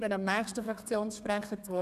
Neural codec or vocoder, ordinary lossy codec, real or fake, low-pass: codec, 32 kHz, 1.9 kbps, SNAC; none; fake; 14.4 kHz